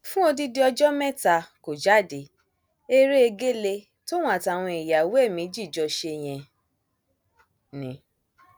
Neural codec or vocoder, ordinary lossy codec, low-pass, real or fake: none; none; none; real